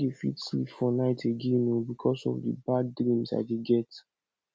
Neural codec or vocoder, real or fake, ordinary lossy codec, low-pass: none; real; none; none